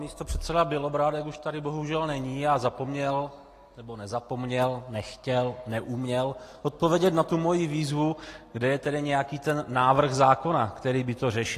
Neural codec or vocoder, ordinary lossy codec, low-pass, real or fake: none; AAC, 48 kbps; 14.4 kHz; real